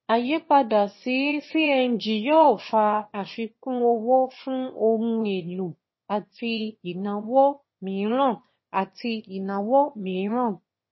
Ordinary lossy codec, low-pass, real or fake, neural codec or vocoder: MP3, 24 kbps; 7.2 kHz; fake; autoencoder, 22.05 kHz, a latent of 192 numbers a frame, VITS, trained on one speaker